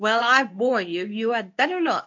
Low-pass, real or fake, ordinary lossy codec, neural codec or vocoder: 7.2 kHz; fake; MP3, 64 kbps; codec, 24 kHz, 0.9 kbps, WavTokenizer, medium speech release version 2